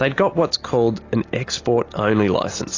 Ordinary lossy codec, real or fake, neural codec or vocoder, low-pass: AAC, 32 kbps; real; none; 7.2 kHz